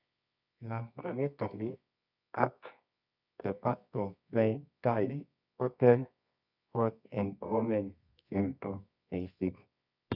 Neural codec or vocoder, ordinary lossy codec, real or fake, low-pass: codec, 24 kHz, 0.9 kbps, WavTokenizer, medium music audio release; none; fake; 5.4 kHz